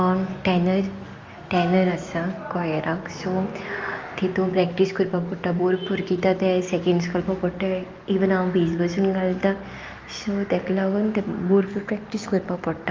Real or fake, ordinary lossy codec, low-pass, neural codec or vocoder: real; Opus, 32 kbps; 7.2 kHz; none